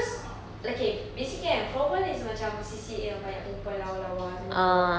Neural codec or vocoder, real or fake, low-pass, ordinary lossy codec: none; real; none; none